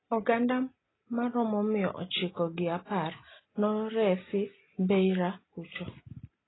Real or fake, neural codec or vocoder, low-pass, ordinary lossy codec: real; none; 7.2 kHz; AAC, 16 kbps